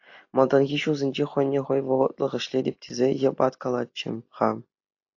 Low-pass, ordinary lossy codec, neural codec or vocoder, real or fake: 7.2 kHz; AAC, 48 kbps; none; real